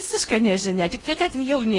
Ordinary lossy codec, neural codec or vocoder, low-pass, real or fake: AAC, 32 kbps; codec, 16 kHz in and 24 kHz out, 0.8 kbps, FocalCodec, streaming, 65536 codes; 10.8 kHz; fake